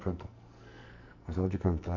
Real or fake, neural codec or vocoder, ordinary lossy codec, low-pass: fake; codec, 44.1 kHz, 2.6 kbps, SNAC; none; 7.2 kHz